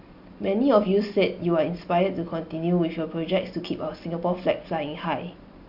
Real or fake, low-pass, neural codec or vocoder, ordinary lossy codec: real; 5.4 kHz; none; Opus, 64 kbps